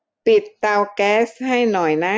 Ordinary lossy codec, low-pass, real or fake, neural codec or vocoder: none; none; real; none